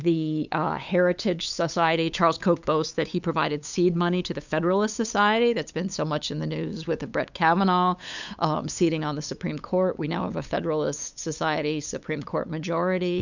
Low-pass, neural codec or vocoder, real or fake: 7.2 kHz; codec, 16 kHz, 8 kbps, FunCodec, trained on LibriTTS, 25 frames a second; fake